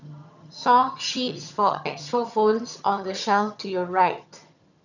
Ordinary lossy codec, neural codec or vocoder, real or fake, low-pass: none; vocoder, 22.05 kHz, 80 mel bands, HiFi-GAN; fake; 7.2 kHz